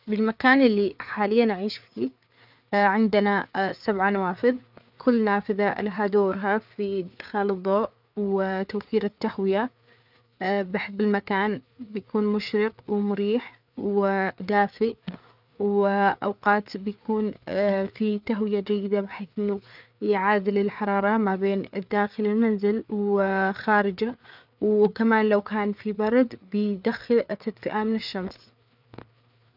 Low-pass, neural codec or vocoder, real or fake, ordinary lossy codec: 5.4 kHz; codec, 16 kHz, 4 kbps, FreqCodec, larger model; fake; none